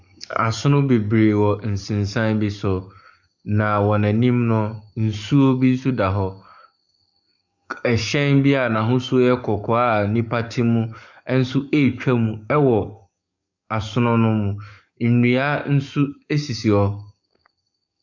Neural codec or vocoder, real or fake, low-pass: codec, 44.1 kHz, 7.8 kbps, DAC; fake; 7.2 kHz